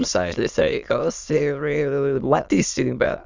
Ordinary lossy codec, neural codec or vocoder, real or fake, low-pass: Opus, 64 kbps; autoencoder, 22.05 kHz, a latent of 192 numbers a frame, VITS, trained on many speakers; fake; 7.2 kHz